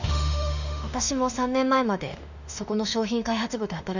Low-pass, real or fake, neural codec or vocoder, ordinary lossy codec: 7.2 kHz; fake; autoencoder, 48 kHz, 32 numbers a frame, DAC-VAE, trained on Japanese speech; none